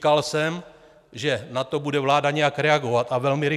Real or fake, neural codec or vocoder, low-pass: real; none; 14.4 kHz